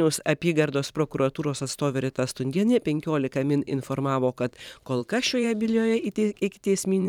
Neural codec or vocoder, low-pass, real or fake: vocoder, 44.1 kHz, 128 mel bands every 512 samples, BigVGAN v2; 19.8 kHz; fake